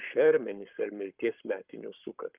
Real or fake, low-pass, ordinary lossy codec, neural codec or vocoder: fake; 3.6 kHz; Opus, 24 kbps; codec, 44.1 kHz, 7.8 kbps, Pupu-Codec